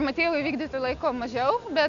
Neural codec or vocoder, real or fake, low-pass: none; real; 7.2 kHz